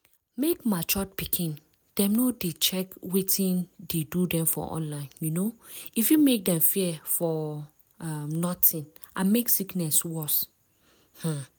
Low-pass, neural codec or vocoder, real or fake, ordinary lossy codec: none; none; real; none